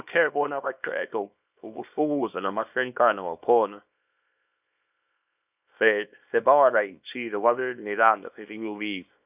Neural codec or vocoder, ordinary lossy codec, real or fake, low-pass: codec, 24 kHz, 0.9 kbps, WavTokenizer, small release; none; fake; 3.6 kHz